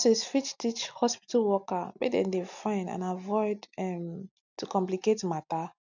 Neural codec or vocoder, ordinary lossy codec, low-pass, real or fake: none; none; 7.2 kHz; real